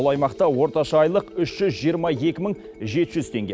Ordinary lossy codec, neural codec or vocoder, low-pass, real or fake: none; none; none; real